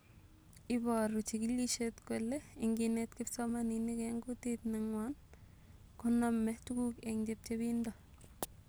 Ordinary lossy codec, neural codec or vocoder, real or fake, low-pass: none; none; real; none